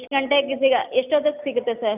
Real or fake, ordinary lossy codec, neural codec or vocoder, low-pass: real; none; none; 3.6 kHz